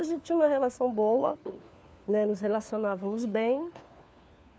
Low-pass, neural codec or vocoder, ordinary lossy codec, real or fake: none; codec, 16 kHz, 4 kbps, FunCodec, trained on LibriTTS, 50 frames a second; none; fake